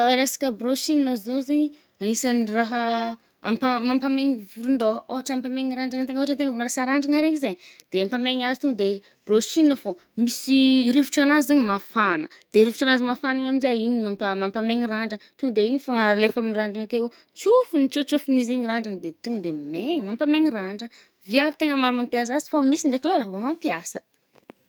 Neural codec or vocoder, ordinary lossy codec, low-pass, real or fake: codec, 44.1 kHz, 2.6 kbps, SNAC; none; none; fake